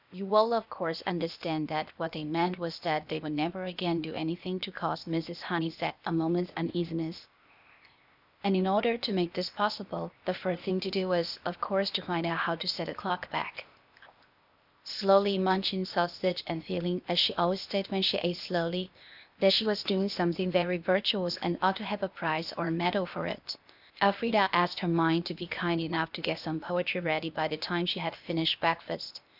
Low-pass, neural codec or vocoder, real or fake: 5.4 kHz; codec, 16 kHz, 0.8 kbps, ZipCodec; fake